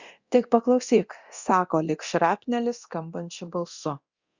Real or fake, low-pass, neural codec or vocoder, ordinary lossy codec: fake; 7.2 kHz; codec, 24 kHz, 0.9 kbps, DualCodec; Opus, 64 kbps